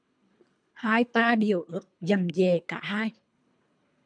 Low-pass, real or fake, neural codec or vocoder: 9.9 kHz; fake; codec, 24 kHz, 3 kbps, HILCodec